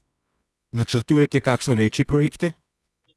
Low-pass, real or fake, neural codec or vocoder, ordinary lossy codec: none; fake; codec, 24 kHz, 0.9 kbps, WavTokenizer, medium music audio release; none